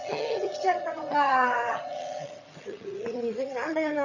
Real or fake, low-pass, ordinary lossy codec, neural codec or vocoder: fake; 7.2 kHz; none; vocoder, 22.05 kHz, 80 mel bands, HiFi-GAN